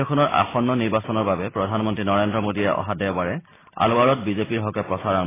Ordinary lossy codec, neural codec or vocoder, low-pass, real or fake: AAC, 16 kbps; none; 3.6 kHz; real